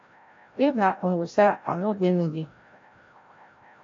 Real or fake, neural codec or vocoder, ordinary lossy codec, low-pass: fake; codec, 16 kHz, 0.5 kbps, FreqCodec, larger model; AAC, 48 kbps; 7.2 kHz